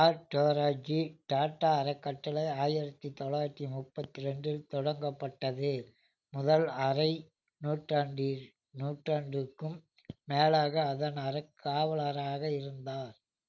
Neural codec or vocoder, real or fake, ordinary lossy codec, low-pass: none; real; none; 7.2 kHz